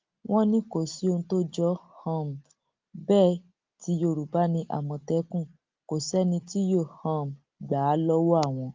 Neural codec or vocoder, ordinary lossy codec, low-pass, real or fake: none; Opus, 32 kbps; 7.2 kHz; real